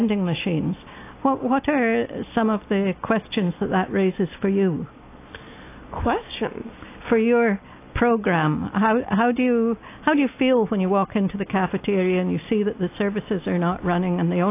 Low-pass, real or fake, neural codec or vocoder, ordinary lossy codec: 3.6 kHz; real; none; AAC, 24 kbps